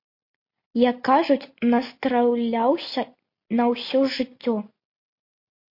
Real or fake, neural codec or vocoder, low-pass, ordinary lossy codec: real; none; 5.4 kHz; MP3, 32 kbps